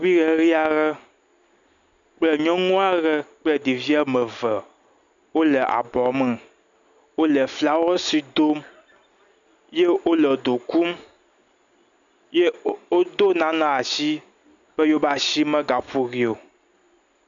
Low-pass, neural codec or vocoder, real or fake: 7.2 kHz; none; real